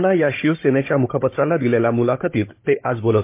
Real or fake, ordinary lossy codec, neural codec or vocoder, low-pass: fake; MP3, 24 kbps; codec, 16 kHz, 16 kbps, FunCodec, trained on Chinese and English, 50 frames a second; 3.6 kHz